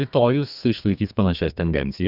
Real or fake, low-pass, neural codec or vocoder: fake; 5.4 kHz; codec, 32 kHz, 1.9 kbps, SNAC